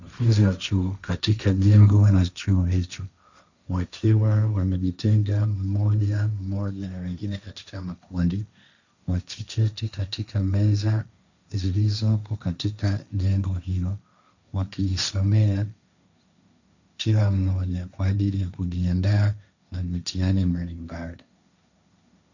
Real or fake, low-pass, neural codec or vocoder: fake; 7.2 kHz; codec, 16 kHz, 1.1 kbps, Voila-Tokenizer